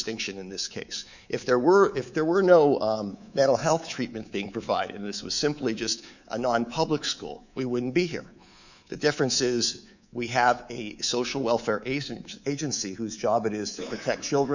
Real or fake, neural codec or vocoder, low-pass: fake; codec, 24 kHz, 3.1 kbps, DualCodec; 7.2 kHz